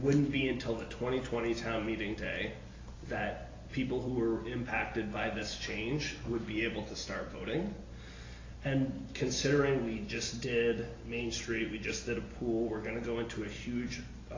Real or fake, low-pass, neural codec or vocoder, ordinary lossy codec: real; 7.2 kHz; none; AAC, 32 kbps